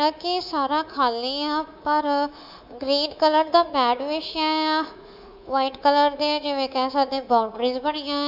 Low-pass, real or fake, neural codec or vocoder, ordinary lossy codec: 5.4 kHz; fake; codec, 24 kHz, 3.1 kbps, DualCodec; none